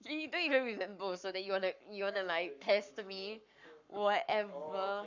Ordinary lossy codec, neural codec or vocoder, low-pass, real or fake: none; codec, 44.1 kHz, 7.8 kbps, Pupu-Codec; 7.2 kHz; fake